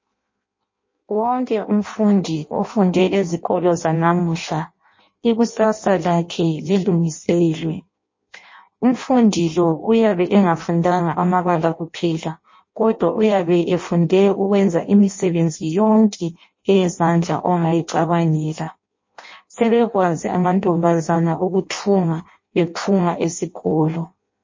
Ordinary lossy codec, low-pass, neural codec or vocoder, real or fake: MP3, 32 kbps; 7.2 kHz; codec, 16 kHz in and 24 kHz out, 0.6 kbps, FireRedTTS-2 codec; fake